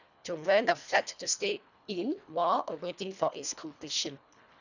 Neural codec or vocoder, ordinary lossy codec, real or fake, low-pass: codec, 24 kHz, 1.5 kbps, HILCodec; none; fake; 7.2 kHz